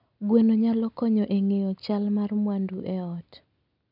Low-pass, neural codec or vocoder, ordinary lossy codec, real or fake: 5.4 kHz; none; none; real